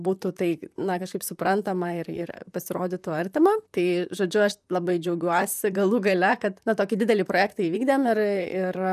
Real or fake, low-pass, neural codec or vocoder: fake; 14.4 kHz; vocoder, 44.1 kHz, 128 mel bands, Pupu-Vocoder